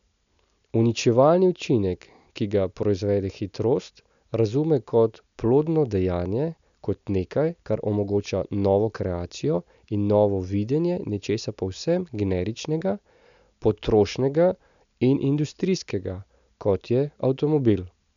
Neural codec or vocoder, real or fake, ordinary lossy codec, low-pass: none; real; none; 7.2 kHz